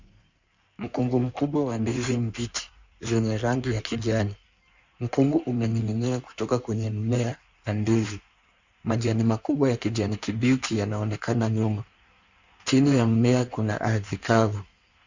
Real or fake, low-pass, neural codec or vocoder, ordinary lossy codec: fake; 7.2 kHz; codec, 16 kHz in and 24 kHz out, 1.1 kbps, FireRedTTS-2 codec; Opus, 64 kbps